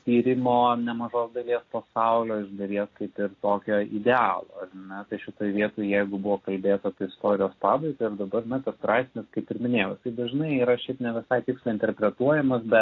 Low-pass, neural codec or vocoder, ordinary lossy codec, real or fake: 7.2 kHz; none; AAC, 32 kbps; real